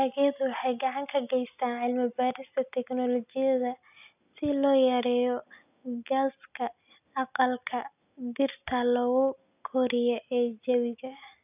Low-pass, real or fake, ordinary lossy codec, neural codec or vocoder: 3.6 kHz; real; none; none